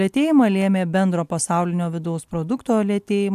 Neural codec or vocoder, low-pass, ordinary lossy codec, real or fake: none; 14.4 kHz; AAC, 96 kbps; real